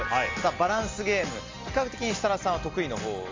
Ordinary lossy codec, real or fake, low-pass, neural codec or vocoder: Opus, 32 kbps; real; 7.2 kHz; none